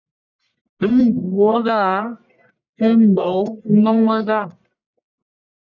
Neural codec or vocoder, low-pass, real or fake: codec, 44.1 kHz, 1.7 kbps, Pupu-Codec; 7.2 kHz; fake